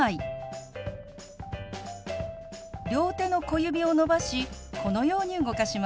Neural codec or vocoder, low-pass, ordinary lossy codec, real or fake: none; none; none; real